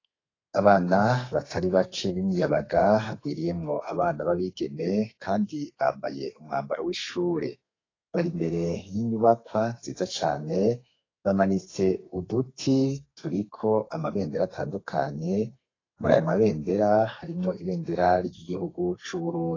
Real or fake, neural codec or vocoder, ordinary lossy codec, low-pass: fake; codec, 32 kHz, 1.9 kbps, SNAC; AAC, 32 kbps; 7.2 kHz